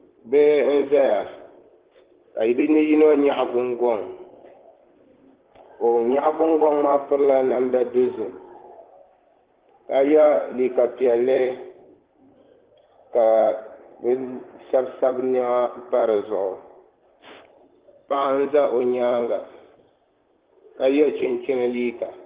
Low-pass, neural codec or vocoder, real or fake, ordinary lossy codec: 3.6 kHz; vocoder, 44.1 kHz, 128 mel bands, Pupu-Vocoder; fake; Opus, 16 kbps